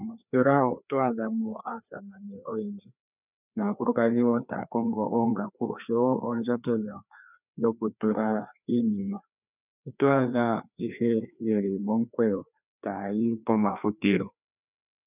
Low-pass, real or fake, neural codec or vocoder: 3.6 kHz; fake; codec, 16 kHz, 2 kbps, FreqCodec, larger model